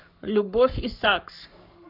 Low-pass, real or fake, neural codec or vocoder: 5.4 kHz; fake; codec, 44.1 kHz, 7.8 kbps, Pupu-Codec